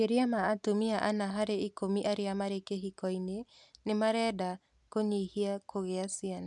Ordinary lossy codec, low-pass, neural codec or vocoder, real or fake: none; 10.8 kHz; none; real